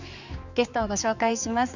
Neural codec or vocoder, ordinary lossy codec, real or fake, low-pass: codec, 16 kHz, 4 kbps, X-Codec, HuBERT features, trained on general audio; none; fake; 7.2 kHz